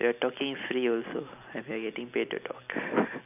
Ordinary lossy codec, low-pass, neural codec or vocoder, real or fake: none; 3.6 kHz; none; real